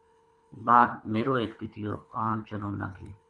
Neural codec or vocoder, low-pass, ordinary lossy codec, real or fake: codec, 24 kHz, 3 kbps, HILCodec; 10.8 kHz; MP3, 96 kbps; fake